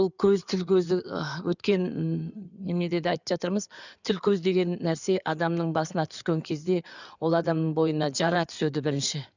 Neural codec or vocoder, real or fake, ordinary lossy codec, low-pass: codec, 16 kHz in and 24 kHz out, 2.2 kbps, FireRedTTS-2 codec; fake; none; 7.2 kHz